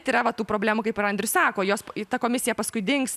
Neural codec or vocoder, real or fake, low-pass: none; real; 14.4 kHz